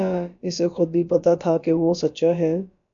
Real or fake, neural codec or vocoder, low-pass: fake; codec, 16 kHz, about 1 kbps, DyCAST, with the encoder's durations; 7.2 kHz